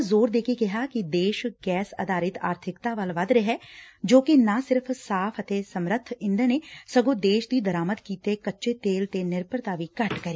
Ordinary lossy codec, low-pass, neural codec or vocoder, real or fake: none; none; none; real